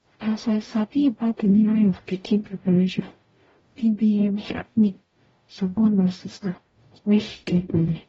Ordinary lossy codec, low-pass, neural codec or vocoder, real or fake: AAC, 24 kbps; 19.8 kHz; codec, 44.1 kHz, 0.9 kbps, DAC; fake